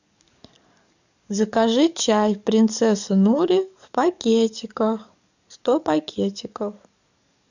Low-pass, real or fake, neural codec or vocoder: 7.2 kHz; fake; codec, 44.1 kHz, 7.8 kbps, DAC